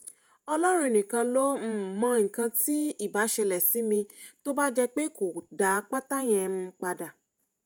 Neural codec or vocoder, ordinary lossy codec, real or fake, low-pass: vocoder, 48 kHz, 128 mel bands, Vocos; none; fake; none